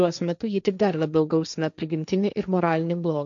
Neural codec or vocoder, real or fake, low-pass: codec, 16 kHz, 1.1 kbps, Voila-Tokenizer; fake; 7.2 kHz